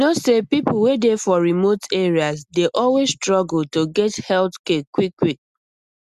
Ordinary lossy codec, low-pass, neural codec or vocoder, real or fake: Opus, 64 kbps; 14.4 kHz; none; real